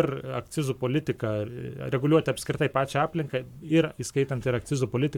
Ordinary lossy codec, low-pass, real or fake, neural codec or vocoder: MP3, 96 kbps; 19.8 kHz; fake; codec, 44.1 kHz, 7.8 kbps, Pupu-Codec